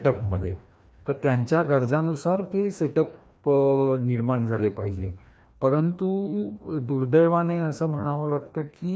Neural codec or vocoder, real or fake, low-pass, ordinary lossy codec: codec, 16 kHz, 1 kbps, FreqCodec, larger model; fake; none; none